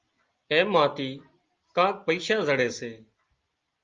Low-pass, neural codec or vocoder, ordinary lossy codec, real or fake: 7.2 kHz; none; Opus, 32 kbps; real